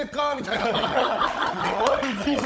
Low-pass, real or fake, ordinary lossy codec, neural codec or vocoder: none; fake; none; codec, 16 kHz, 16 kbps, FunCodec, trained on Chinese and English, 50 frames a second